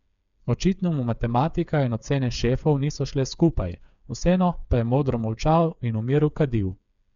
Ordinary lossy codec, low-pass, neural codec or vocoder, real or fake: none; 7.2 kHz; codec, 16 kHz, 8 kbps, FreqCodec, smaller model; fake